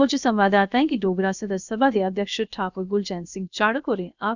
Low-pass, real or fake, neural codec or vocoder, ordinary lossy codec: 7.2 kHz; fake; codec, 16 kHz, about 1 kbps, DyCAST, with the encoder's durations; none